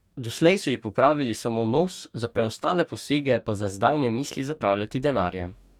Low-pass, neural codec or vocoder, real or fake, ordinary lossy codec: 19.8 kHz; codec, 44.1 kHz, 2.6 kbps, DAC; fake; none